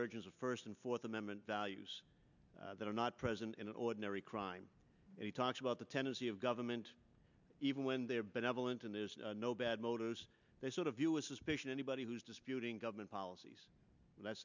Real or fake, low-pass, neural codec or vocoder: real; 7.2 kHz; none